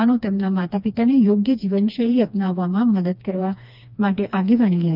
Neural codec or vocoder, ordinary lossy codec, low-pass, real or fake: codec, 16 kHz, 2 kbps, FreqCodec, smaller model; none; 5.4 kHz; fake